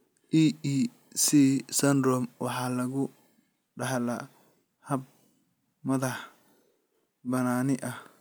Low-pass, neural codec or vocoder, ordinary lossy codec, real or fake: none; none; none; real